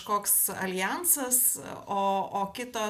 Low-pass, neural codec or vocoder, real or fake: 14.4 kHz; none; real